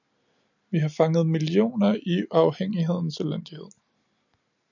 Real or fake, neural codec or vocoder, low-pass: real; none; 7.2 kHz